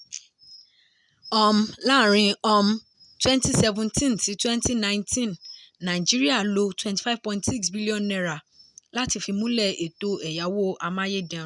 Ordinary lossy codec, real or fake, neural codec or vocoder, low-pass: none; real; none; 10.8 kHz